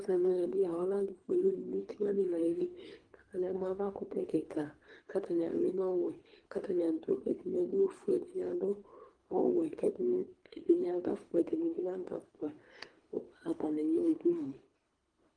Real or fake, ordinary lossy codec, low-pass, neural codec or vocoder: fake; Opus, 24 kbps; 9.9 kHz; codec, 24 kHz, 3 kbps, HILCodec